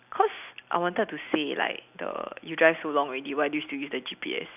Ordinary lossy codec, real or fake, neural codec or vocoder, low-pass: none; real; none; 3.6 kHz